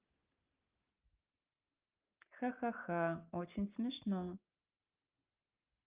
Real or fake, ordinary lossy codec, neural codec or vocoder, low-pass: real; Opus, 32 kbps; none; 3.6 kHz